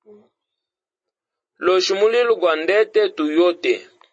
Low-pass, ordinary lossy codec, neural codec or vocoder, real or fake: 9.9 kHz; MP3, 32 kbps; none; real